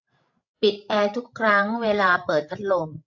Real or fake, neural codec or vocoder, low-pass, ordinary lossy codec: fake; codec, 16 kHz, 16 kbps, FreqCodec, larger model; 7.2 kHz; AAC, 48 kbps